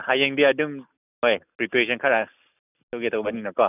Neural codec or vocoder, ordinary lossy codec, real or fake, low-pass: none; none; real; 3.6 kHz